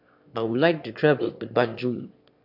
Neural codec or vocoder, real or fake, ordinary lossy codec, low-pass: autoencoder, 22.05 kHz, a latent of 192 numbers a frame, VITS, trained on one speaker; fake; AAC, 48 kbps; 5.4 kHz